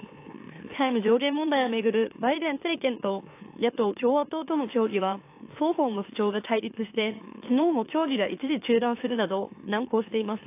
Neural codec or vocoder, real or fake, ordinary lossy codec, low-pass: autoencoder, 44.1 kHz, a latent of 192 numbers a frame, MeloTTS; fake; AAC, 24 kbps; 3.6 kHz